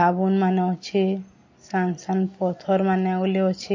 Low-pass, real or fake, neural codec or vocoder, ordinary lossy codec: 7.2 kHz; real; none; MP3, 32 kbps